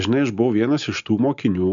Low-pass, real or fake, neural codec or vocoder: 7.2 kHz; real; none